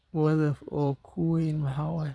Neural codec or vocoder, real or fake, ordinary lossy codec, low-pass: vocoder, 22.05 kHz, 80 mel bands, Vocos; fake; none; none